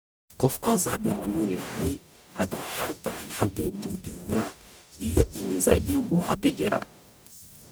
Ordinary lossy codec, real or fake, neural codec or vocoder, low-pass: none; fake; codec, 44.1 kHz, 0.9 kbps, DAC; none